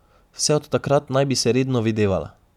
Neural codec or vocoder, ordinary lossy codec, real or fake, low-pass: none; none; real; 19.8 kHz